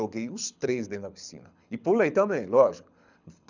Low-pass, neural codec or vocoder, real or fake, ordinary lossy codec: 7.2 kHz; codec, 24 kHz, 6 kbps, HILCodec; fake; none